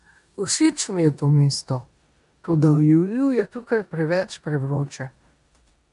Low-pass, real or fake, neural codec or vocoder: 10.8 kHz; fake; codec, 16 kHz in and 24 kHz out, 0.9 kbps, LongCat-Audio-Codec, four codebook decoder